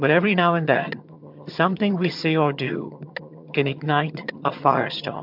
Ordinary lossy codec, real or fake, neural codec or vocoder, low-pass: MP3, 48 kbps; fake; vocoder, 22.05 kHz, 80 mel bands, HiFi-GAN; 5.4 kHz